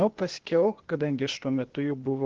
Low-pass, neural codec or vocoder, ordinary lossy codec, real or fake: 7.2 kHz; codec, 16 kHz, 0.8 kbps, ZipCodec; Opus, 16 kbps; fake